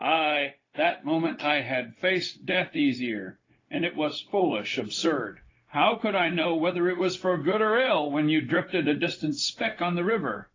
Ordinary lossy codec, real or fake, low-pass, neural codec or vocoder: AAC, 32 kbps; fake; 7.2 kHz; codec, 16 kHz, 0.4 kbps, LongCat-Audio-Codec